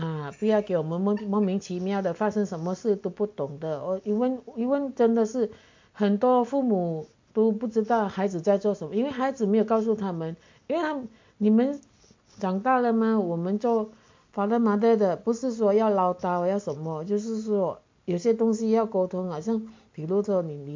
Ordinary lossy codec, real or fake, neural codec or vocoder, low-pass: MP3, 48 kbps; real; none; 7.2 kHz